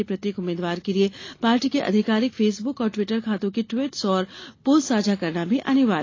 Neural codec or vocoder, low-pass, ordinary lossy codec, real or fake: none; 7.2 kHz; AAC, 32 kbps; real